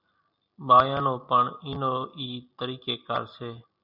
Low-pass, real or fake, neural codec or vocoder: 5.4 kHz; real; none